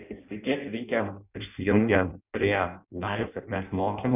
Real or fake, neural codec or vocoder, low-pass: fake; codec, 16 kHz in and 24 kHz out, 0.6 kbps, FireRedTTS-2 codec; 3.6 kHz